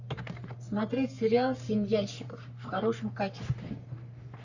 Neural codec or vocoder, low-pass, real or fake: codec, 44.1 kHz, 3.4 kbps, Pupu-Codec; 7.2 kHz; fake